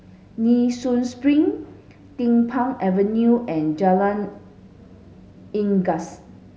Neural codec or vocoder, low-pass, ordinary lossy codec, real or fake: none; none; none; real